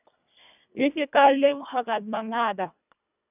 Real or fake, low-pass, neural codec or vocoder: fake; 3.6 kHz; codec, 24 kHz, 1.5 kbps, HILCodec